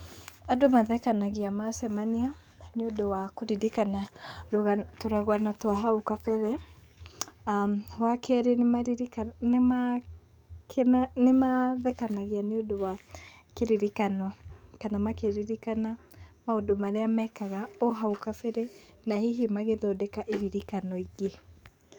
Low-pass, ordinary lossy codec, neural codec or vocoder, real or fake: 19.8 kHz; none; codec, 44.1 kHz, 7.8 kbps, DAC; fake